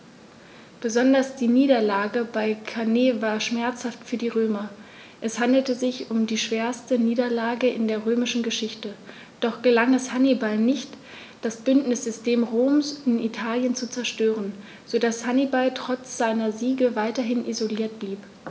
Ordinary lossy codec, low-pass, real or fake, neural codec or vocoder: none; none; real; none